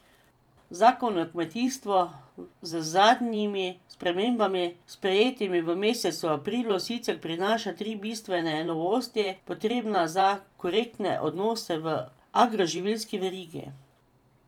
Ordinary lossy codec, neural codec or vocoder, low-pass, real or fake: none; vocoder, 44.1 kHz, 128 mel bands every 512 samples, BigVGAN v2; 19.8 kHz; fake